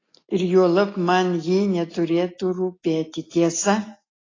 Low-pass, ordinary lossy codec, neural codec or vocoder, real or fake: 7.2 kHz; AAC, 32 kbps; none; real